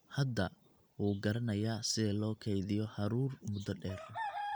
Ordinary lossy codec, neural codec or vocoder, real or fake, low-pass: none; none; real; none